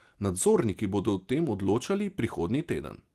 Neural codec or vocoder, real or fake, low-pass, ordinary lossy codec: none; real; 14.4 kHz; Opus, 24 kbps